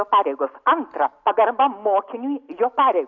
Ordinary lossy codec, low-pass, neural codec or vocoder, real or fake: MP3, 48 kbps; 7.2 kHz; none; real